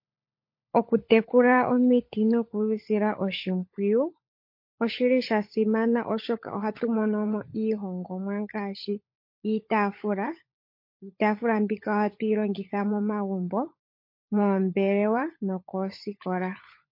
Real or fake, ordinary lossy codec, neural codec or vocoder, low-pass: fake; MP3, 32 kbps; codec, 16 kHz, 16 kbps, FunCodec, trained on LibriTTS, 50 frames a second; 5.4 kHz